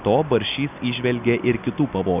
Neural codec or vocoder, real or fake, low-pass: none; real; 3.6 kHz